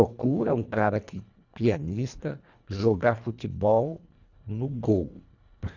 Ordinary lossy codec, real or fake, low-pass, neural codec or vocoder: none; fake; 7.2 kHz; codec, 24 kHz, 1.5 kbps, HILCodec